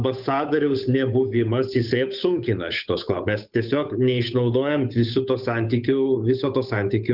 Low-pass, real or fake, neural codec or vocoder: 5.4 kHz; fake; codec, 16 kHz, 8 kbps, FunCodec, trained on Chinese and English, 25 frames a second